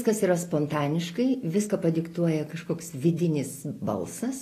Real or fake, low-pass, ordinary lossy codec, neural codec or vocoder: real; 14.4 kHz; AAC, 48 kbps; none